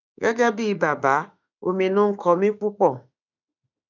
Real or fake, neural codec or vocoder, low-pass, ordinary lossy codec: fake; autoencoder, 48 kHz, 32 numbers a frame, DAC-VAE, trained on Japanese speech; 7.2 kHz; none